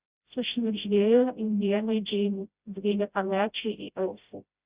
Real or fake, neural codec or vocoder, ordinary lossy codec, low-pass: fake; codec, 16 kHz, 0.5 kbps, FreqCodec, smaller model; Opus, 64 kbps; 3.6 kHz